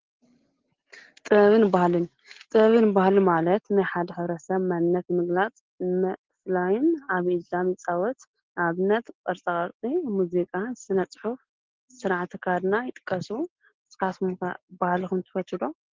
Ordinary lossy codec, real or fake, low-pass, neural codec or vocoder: Opus, 16 kbps; real; 7.2 kHz; none